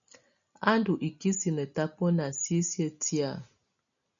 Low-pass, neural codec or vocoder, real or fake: 7.2 kHz; none; real